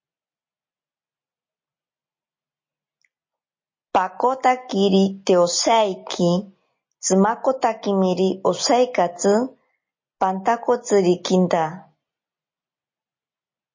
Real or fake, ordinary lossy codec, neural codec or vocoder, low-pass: real; MP3, 32 kbps; none; 7.2 kHz